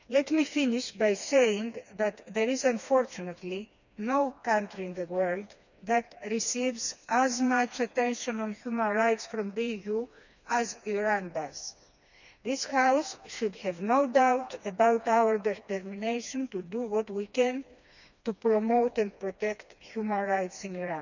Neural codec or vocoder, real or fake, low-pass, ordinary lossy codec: codec, 16 kHz, 2 kbps, FreqCodec, smaller model; fake; 7.2 kHz; none